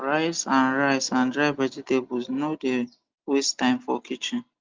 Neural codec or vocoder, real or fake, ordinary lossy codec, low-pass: none; real; Opus, 32 kbps; 7.2 kHz